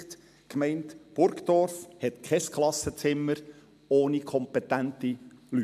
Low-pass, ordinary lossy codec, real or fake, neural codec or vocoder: 14.4 kHz; MP3, 96 kbps; real; none